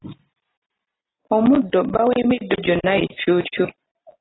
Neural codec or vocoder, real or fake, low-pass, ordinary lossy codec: none; real; 7.2 kHz; AAC, 16 kbps